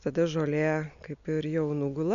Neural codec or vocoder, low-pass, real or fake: none; 7.2 kHz; real